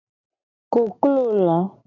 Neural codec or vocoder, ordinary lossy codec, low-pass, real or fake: none; AAC, 48 kbps; 7.2 kHz; real